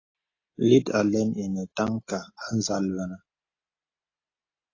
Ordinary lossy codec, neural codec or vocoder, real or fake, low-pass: AAC, 32 kbps; none; real; 7.2 kHz